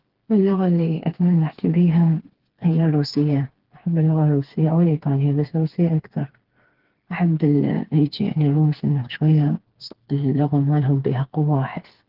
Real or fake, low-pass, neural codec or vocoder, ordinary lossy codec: fake; 5.4 kHz; codec, 16 kHz, 4 kbps, FreqCodec, smaller model; Opus, 16 kbps